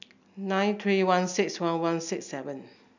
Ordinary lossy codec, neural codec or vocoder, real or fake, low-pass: none; none; real; 7.2 kHz